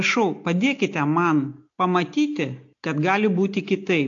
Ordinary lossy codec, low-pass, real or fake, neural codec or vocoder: AAC, 48 kbps; 7.2 kHz; real; none